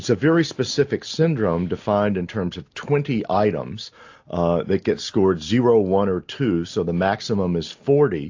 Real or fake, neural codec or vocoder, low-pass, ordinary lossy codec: real; none; 7.2 kHz; AAC, 48 kbps